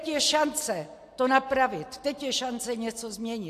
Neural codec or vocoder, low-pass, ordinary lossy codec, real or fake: none; 14.4 kHz; AAC, 64 kbps; real